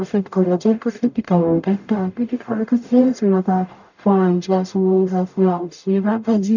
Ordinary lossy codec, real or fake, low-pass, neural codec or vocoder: none; fake; 7.2 kHz; codec, 44.1 kHz, 0.9 kbps, DAC